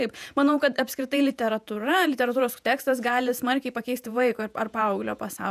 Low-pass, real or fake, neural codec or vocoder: 14.4 kHz; fake; vocoder, 48 kHz, 128 mel bands, Vocos